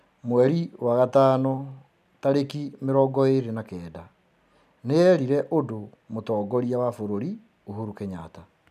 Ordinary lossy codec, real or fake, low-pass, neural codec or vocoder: none; real; 14.4 kHz; none